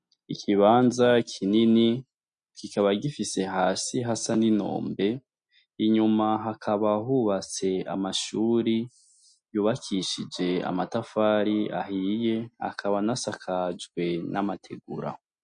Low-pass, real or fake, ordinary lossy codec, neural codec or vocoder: 9.9 kHz; real; MP3, 48 kbps; none